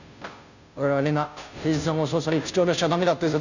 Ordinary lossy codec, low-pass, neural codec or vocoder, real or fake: none; 7.2 kHz; codec, 16 kHz, 0.5 kbps, FunCodec, trained on Chinese and English, 25 frames a second; fake